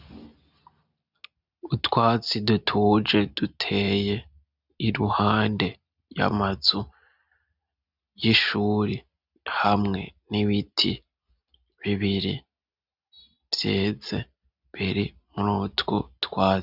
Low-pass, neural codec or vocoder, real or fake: 5.4 kHz; none; real